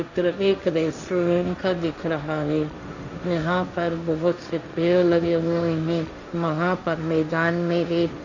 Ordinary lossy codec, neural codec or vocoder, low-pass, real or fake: none; codec, 16 kHz, 1.1 kbps, Voila-Tokenizer; 7.2 kHz; fake